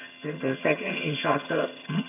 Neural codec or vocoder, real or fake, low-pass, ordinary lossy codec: vocoder, 22.05 kHz, 80 mel bands, HiFi-GAN; fake; 3.6 kHz; none